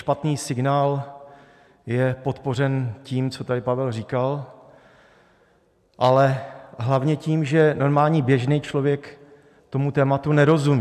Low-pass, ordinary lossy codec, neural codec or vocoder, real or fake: 14.4 kHz; MP3, 96 kbps; none; real